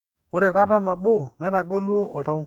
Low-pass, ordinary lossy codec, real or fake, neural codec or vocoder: 19.8 kHz; none; fake; codec, 44.1 kHz, 2.6 kbps, DAC